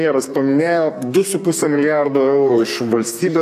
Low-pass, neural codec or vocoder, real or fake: 14.4 kHz; codec, 32 kHz, 1.9 kbps, SNAC; fake